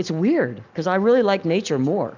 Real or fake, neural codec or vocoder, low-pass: real; none; 7.2 kHz